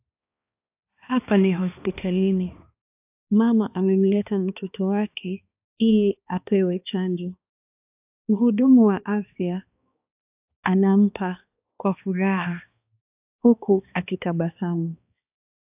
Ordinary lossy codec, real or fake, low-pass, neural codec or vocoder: AAC, 32 kbps; fake; 3.6 kHz; codec, 16 kHz, 2 kbps, X-Codec, HuBERT features, trained on balanced general audio